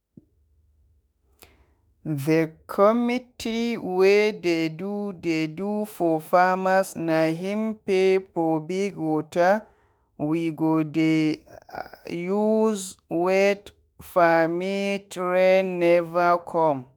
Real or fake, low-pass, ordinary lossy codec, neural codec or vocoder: fake; none; none; autoencoder, 48 kHz, 32 numbers a frame, DAC-VAE, trained on Japanese speech